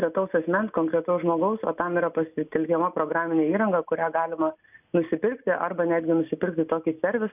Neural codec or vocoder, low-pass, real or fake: none; 3.6 kHz; real